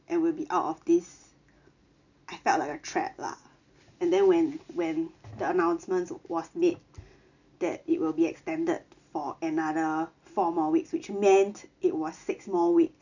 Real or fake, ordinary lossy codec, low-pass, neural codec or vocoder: real; none; 7.2 kHz; none